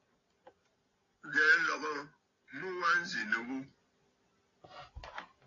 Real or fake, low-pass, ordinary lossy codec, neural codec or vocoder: real; 7.2 kHz; AAC, 32 kbps; none